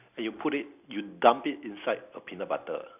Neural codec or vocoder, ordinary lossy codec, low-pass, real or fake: none; AAC, 32 kbps; 3.6 kHz; real